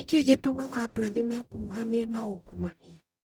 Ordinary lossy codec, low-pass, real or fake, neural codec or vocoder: none; none; fake; codec, 44.1 kHz, 0.9 kbps, DAC